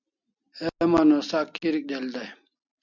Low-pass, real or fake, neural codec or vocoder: 7.2 kHz; real; none